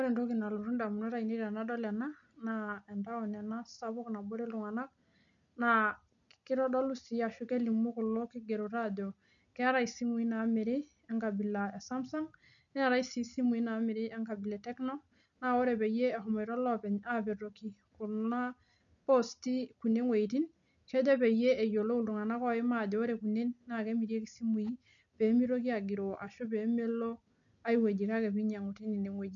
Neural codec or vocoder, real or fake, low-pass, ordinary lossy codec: none; real; 7.2 kHz; none